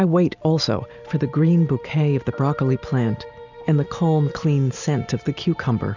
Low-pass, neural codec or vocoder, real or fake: 7.2 kHz; none; real